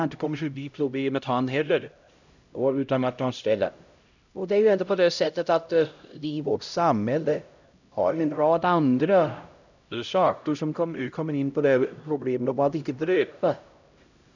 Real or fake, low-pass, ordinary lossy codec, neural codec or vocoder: fake; 7.2 kHz; none; codec, 16 kHz, 0.5 kbps, X-Codec, HuBERT features, trained on LibriSpeech